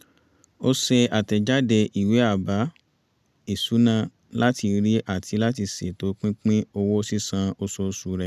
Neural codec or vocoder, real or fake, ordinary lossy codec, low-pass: none; real; none; 14.4 kHz